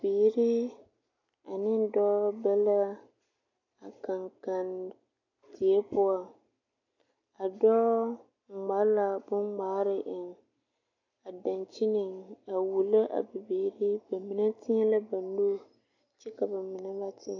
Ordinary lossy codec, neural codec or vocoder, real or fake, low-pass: AAC, 48 kbps; none; real; 7.2 kHz